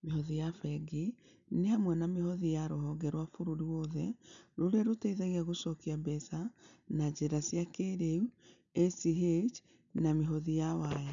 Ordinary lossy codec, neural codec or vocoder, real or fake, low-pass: none; none; real; 7.2 kHz